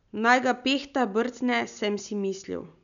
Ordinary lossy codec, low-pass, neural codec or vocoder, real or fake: none; 7.2 kHz; none; real